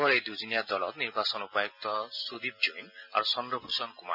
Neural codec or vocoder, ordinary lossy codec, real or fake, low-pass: none; none; real; 5.4 kHz